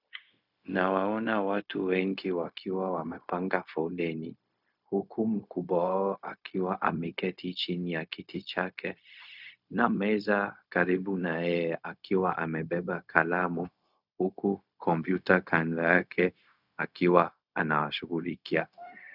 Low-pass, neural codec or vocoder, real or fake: 5.4 kHz; codec, 16 kHz, 0.4 kbps, LongCat-Audio-Codec; fake